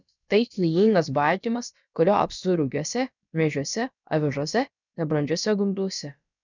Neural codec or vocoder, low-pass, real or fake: codec, 16 kHz, about 1 kbps, DyCAST, with the encoder's durations; 7.2 kHz; fake